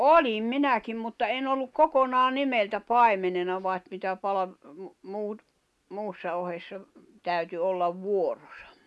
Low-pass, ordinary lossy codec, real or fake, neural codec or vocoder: none; none; real; none